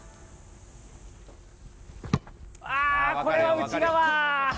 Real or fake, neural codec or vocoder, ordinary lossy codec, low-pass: real; none; none; none